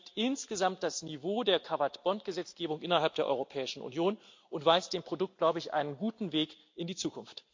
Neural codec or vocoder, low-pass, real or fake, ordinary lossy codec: none; 7.2 kHz; real; MP3, 48 kbps